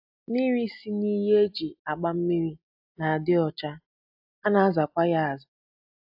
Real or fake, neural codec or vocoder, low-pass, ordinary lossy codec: real; none; 5.4 kHz; AAC, 48 kbps